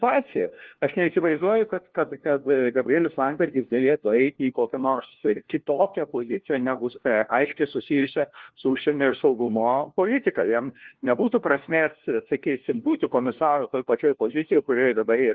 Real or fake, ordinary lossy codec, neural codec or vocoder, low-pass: fake; Opus, 16 kbps; codec, 16 kHz, 1 kbps, FunCodec, trained on LibriTTS, 50 frames a second; 7.2 kHz